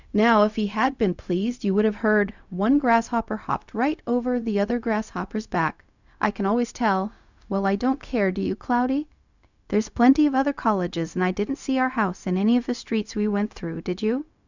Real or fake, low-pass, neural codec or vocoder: fake; 7.2 kHz; codec, 16 kHz, 0.4 kbps, LongCat-Audio-Codec